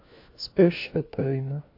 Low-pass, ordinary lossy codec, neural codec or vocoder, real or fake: 5.4 kHz; MP3, 32 kbps; codec, 16 kHz, 1 kbps, FunCodec, trained on LibriTTS, 50 frames a second; fake